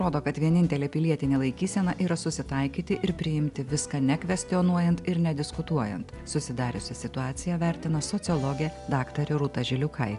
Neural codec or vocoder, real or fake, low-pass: none; real; 10.8 kHz